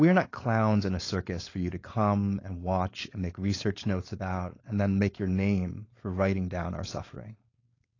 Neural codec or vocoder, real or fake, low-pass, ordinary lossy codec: codec, 16 kHz, 4.8 kbps, FACodec; fake; 7.2 kHz; AAC, 32 kbps